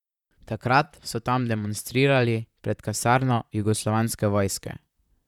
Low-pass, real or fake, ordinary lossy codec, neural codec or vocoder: 19.8 kHz; fake; none; vocoder, 44.1 kHz, 128 mel bands, Pupu-Vocoder